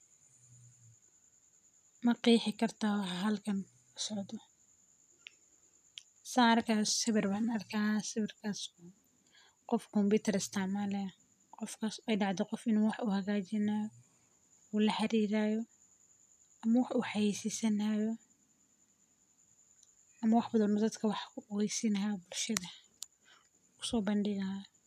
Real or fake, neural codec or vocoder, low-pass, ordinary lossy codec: real; none; 14.4 kHz; none